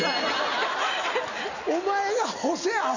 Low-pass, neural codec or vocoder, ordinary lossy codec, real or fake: 7.2 kHz; none; none; real